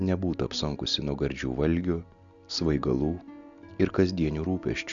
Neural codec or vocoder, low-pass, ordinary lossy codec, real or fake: none; 7.2 kHz; Opus, 64 kbps; real